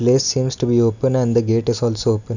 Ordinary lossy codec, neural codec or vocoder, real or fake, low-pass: none; none; real; 7.2 kHz